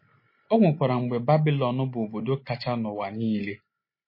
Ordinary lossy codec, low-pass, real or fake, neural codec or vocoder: MP3, 24 kbps; 5.4 kHz; real; none